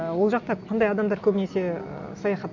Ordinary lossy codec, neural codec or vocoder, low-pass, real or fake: none; none; 7.2 kHz; real